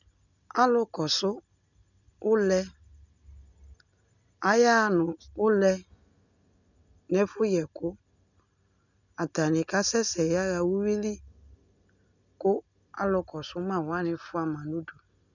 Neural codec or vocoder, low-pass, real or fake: none; 7.2 kHz; real